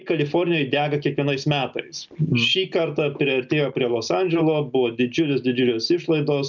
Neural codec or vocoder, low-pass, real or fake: none; 7.2 kHz; real